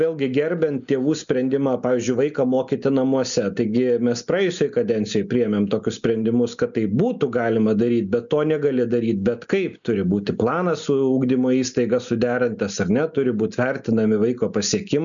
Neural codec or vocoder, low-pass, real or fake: none; 7.2 kHz; real